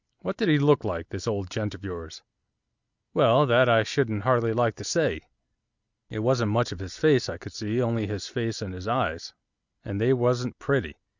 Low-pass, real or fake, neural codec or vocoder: 7.2 kHz; real; none